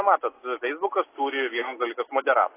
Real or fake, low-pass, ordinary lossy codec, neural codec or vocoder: real; 3.6 kHz; AAC, 24 kbps; none